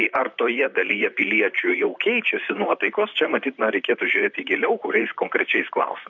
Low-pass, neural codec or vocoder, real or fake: 7.2 kHz; vocoder, 44.1 kHz, 128 mel bands, Pupu-Vocoder; fake